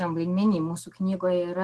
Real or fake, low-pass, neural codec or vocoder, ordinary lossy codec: real; 10.8 kHz; none; Opus, 16 kbps